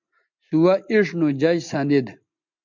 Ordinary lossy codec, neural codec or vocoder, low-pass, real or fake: MP3, 64 kbps; none; 7.2 kHz; real